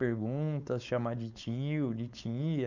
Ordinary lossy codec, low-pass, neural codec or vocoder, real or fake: none; 7.2 kHz; codec, 16 kHz, 4.8 kbps, FACodec; fake